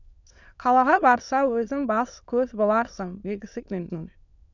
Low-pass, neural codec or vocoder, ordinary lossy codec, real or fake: 7.2 kHz; autoencoder, 22.05 kHz, a latent of 192 numbers a frame, VITS, trained on many speakers; none; fake